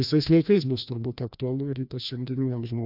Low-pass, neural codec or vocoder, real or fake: 5.4 kHz; codec, 16 kHz, 1 kbps, FreqCodec, larger model; fake